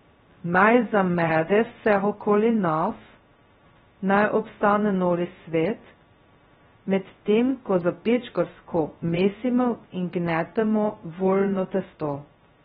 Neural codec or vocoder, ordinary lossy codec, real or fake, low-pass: codec, 16 kHz, 0.2 kbps, FocalCodec; AAC, 16 kbps; fake; 7.2 kHz